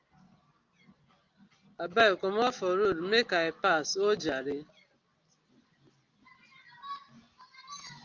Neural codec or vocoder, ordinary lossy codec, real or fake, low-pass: none; Opus, 24 kbps; real; 7.2 kHz